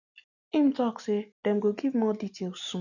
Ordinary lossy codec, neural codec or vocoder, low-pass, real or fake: none; none; 7.2 kHz; real